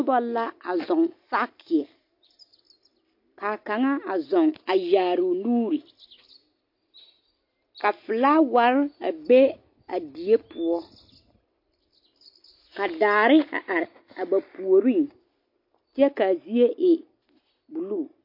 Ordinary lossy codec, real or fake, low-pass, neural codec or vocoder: MP3, 32 kbps; real; 5.4 kHz; none